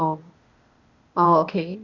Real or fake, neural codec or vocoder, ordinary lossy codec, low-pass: fake; codec, 16 kHz, 0.8 kbps, ZipCodec; Opus, 64 kbps; 7.2 kHz